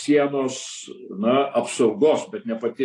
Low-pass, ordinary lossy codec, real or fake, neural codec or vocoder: 10.8 kHz; AAC, 48 kbps; real; none